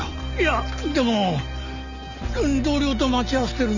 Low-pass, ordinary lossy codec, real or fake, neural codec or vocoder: 7.2 kHz; none; real; none